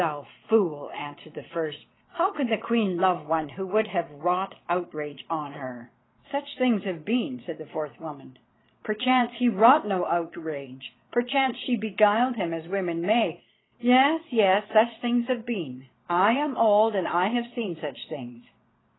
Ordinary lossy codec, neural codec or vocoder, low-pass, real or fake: AAC, 16 kbps; vocoder, 22.05 kHz, 80 mel bands, Vocos; 7.2 kHz; fake